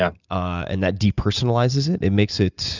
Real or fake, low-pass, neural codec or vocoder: real; 7.2 kHz; none